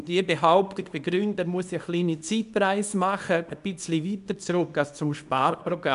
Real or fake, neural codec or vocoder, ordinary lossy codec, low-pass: fake; codec, 24 kHz, 0.9 kbps, WavTokenizer, small release; none; 10.8 kHz